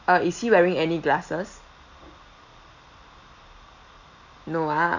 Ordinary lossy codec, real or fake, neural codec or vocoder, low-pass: none; real; none; 7.2 kHz